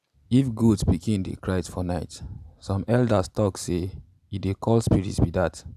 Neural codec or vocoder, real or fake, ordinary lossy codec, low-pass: none; real; none; 14.4 kHz